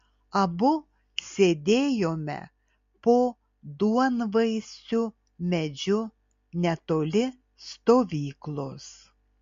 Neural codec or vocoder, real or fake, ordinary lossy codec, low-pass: none; real; MP3, 48 kbps; 7.2 kHz